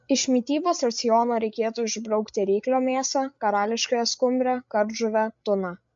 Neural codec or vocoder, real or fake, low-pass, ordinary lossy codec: codec, 16 kHz, 8 kbps, FreqCodec, larger model; fake; 7.2 kHz; MP3, 48 kbps